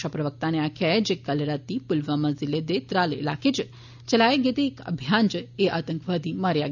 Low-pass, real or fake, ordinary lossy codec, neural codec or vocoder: 7.2 kHz; real; none; none